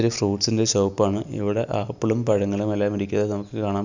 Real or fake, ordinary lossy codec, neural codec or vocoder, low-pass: real; none; none; 7.2 kHz